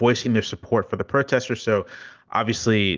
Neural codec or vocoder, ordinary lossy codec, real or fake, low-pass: none; Opus, 32 kbps; real; 7.2 kHz